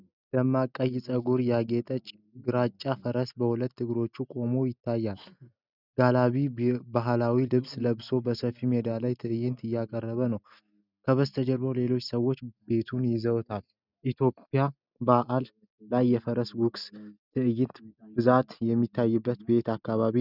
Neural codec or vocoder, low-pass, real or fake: none; 5.4 kHz; real